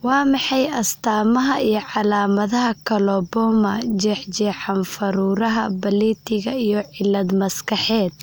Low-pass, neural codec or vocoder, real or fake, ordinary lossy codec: none; none; real; none